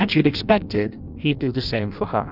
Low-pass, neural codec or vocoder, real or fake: 5.4 kHz; codec, 16 kHz in and 24 kHz out, 0.6 kbps, FireRedTTS-2 codec; fake